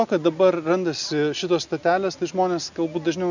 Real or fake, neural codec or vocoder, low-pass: real; none; 7.2 kHz